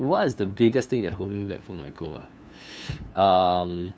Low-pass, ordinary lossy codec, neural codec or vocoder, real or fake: none; none; codec, 16 kHz, 2 kbps, FunCodec, trained on LibriTTS, 25 frames a second; fake